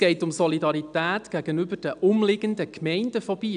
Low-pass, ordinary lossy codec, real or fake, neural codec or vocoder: 9.9 kHz; MP3, 96 kbps; real; none